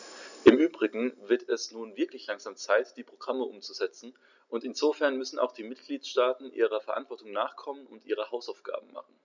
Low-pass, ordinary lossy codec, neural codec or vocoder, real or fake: 7.2 kHz; none; none; real